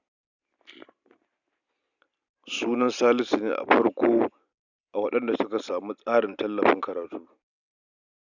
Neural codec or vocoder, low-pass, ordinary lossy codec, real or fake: none; 7.2 kHz; none; real